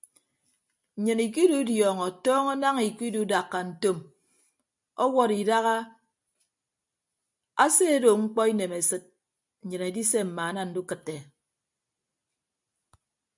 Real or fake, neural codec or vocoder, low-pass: real; none; 10.8 kHz